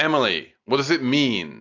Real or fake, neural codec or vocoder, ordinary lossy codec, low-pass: real; none; AAC, 48 kbps; 7.2 kHz